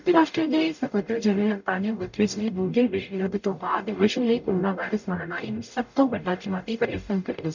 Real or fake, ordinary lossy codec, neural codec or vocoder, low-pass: fake; none; codec, 44.1 kHz, 0.9 kbps, DAC; 7.2 kHz